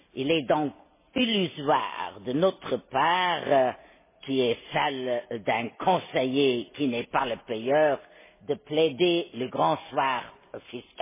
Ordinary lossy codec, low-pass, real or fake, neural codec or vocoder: MP3, 16 kbps; 3.6 kHz; real; none